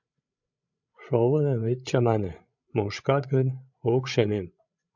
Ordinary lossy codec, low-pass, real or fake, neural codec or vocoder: MP3, 64 kbps; 7.2 kHz; fake; codec, 16 kHz, 16 kbps, FreqCodec, larger model